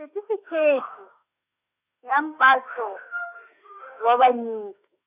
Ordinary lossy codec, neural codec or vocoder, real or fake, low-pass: none; autoencoder, 48 kHz, 32 numbers a frame, DAC-VAE, trained on Japanese speech; fake; 3.6 kHz